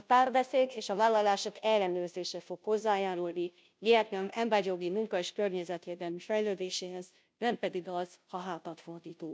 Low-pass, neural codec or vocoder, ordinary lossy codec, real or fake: none; codec, 16 kHz, 0.5 kbps, FunCodec, trained on Chinese and English, 25 frames a second; none; fake